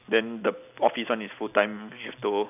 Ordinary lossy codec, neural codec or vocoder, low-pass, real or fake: none; none; 3.6 kHz; real